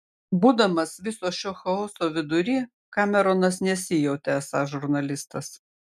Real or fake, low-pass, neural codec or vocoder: real; 14.4 kHz; none